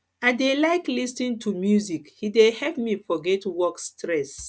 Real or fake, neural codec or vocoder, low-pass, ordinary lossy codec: real; none; none; none